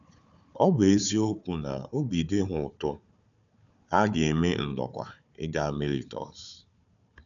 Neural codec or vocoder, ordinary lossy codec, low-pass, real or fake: codec, 16 kHz, 4 kbps, FunCodec, trained on Chinese and English, 50 frames a second; none; 7.2 kHz; fake